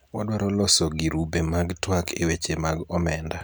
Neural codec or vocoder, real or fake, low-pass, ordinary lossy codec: none; real; none; none